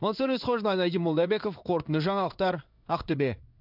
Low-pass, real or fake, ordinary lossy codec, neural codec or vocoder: 5.4 kHz; fake; none; codec, 16 kHz in and 24 kHz out, 1 kbps, XY-Tokenizer